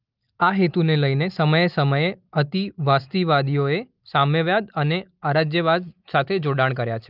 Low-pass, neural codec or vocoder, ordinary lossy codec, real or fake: 5.4 kHz; none; Opus, 32 kbps; real